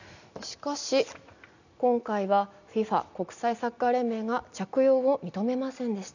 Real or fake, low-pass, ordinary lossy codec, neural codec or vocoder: real; 7.2 kHz; none; none